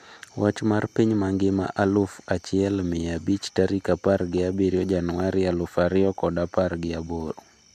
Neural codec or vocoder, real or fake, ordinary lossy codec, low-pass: none; real; MP3, 96 kbps; 14.4 kHz